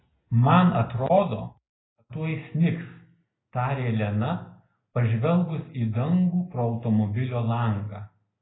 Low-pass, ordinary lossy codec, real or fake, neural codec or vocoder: 7.2 kHz; AAC, 16 kbps; real; none